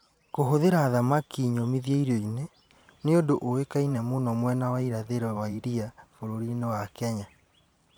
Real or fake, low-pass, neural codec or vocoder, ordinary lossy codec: fake; none; vocoder, 44.1 kHz, 128 mel bands every 512 samples, BigVGAN v2; none